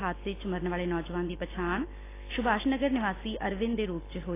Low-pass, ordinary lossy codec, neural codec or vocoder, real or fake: 3.6 kHz; AAC, 16 kbps; none; real